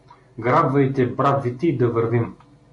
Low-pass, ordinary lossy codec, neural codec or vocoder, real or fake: 10.8 kHz; MP3, 48 kbps; none; real